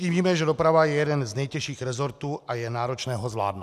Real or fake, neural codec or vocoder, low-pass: real; none; 14.4 kHz